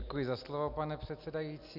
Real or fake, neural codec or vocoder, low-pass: real; none; 5.4 kHz